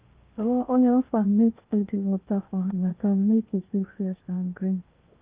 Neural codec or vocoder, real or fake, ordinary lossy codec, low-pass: codec, 16 kHz in and 24 kHz out, 0.8 kbps, FocalCodec, streaming, 65536 codes; fake; Opus, 32 kbps; 3.6 kHz